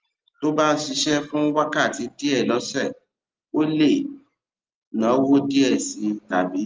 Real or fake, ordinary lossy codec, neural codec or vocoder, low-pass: real; Opus, 24 kbps; none; 7.2 kHz